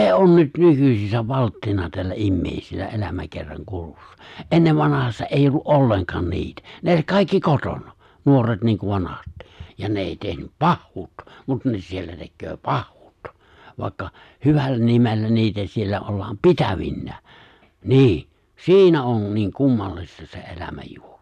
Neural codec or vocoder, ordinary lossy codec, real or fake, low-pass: none; none; real; 14.4 kHz